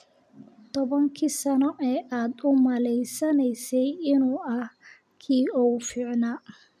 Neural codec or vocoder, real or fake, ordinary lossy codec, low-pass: none; real; none; 14.4 kHz